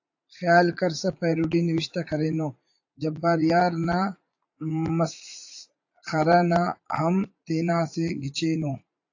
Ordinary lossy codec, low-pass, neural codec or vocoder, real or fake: AAC, 48 kbps; 7.2 kHz; vocoder, 44.1 kHz, 80 mel bands, Vocos; fake